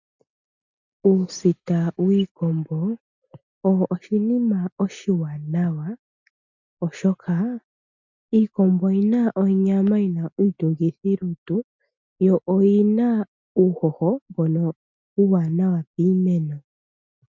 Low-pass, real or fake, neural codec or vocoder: 7.2 kHz; real; none